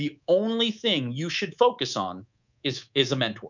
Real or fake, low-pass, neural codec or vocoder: fake; 7.2 kHz; codec, 24 kHz, 3.1 kbps, DualCodec